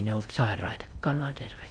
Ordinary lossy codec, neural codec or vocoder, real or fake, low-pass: none; codec, 16 kHz in and 24 kHz out, 0.6 kbps, FocalCodec, streaming, 4096 codes; fake; 9.9 kHz